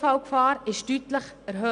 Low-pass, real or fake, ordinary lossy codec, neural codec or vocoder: 9.9 kHz; real; none; none